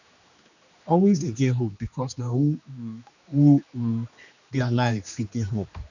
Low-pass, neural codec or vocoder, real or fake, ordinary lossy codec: 7.2 kHz; codec, 16 kHz, 2 kbps, X-Codec, HuBERT features, trained on general audio; fake; none